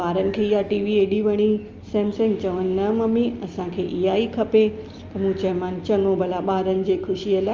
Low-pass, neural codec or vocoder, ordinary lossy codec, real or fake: 7.2 kHz; none; Opus, 24 kbps; real